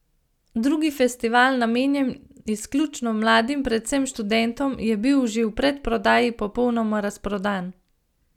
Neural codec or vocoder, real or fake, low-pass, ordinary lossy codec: none; real; 19.8 kHz; none